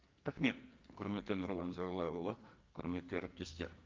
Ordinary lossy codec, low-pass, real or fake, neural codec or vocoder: Opus, 32 kbps; 7.2 kHz; fake; codec, 44.1 kHz, 2.6 kbps, SNAC